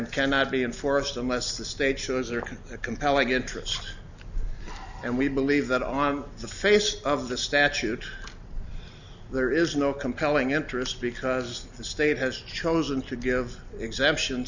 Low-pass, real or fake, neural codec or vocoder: 7.2 kHz; real; none